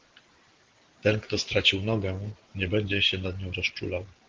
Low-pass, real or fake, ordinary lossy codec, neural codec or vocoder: 7.2 kHz; real; Opus, 16 kbps; none